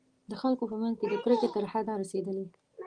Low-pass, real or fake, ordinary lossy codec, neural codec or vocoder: 9.9 kHz; real; Opus, 24 kbps; none